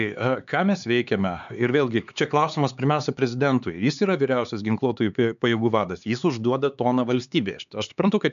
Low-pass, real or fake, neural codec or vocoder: 7.2 kHz; fake; codec, 16 kHz, 4 kbps, X-Codec, HuBERT features, trained on LibriSpeech